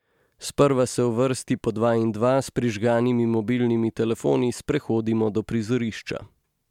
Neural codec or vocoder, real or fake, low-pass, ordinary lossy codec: none; real; 19.8 kHz; MP3, 96 kbps